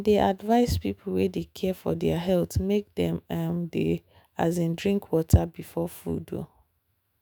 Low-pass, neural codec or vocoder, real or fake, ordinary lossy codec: none; autoencoder, 48 kHz, 128 numbers a frame, DAC-VAE, trained on Japanese speech; fake; none